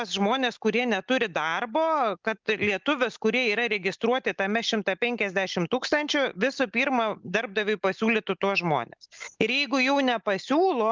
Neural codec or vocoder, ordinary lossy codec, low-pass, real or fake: none; Opus, 24 kbps; 7.2 kHz; real